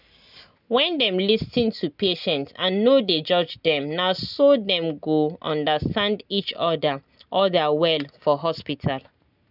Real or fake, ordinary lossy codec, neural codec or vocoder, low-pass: real; none; none; 5.4 kHz